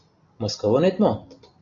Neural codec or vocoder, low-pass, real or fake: none; 7.2 kHz; real